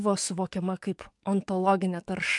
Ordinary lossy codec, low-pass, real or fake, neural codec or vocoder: MP3, 64 kbps; 10.8 kHz; fake; codec, 44.1 kHz, 7.8 kbps, DAC